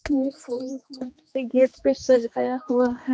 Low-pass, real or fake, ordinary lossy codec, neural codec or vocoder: none; fake; none; codec, 16 kHz, 2 kbps, X-Codec, HuBERT features, trained on general audio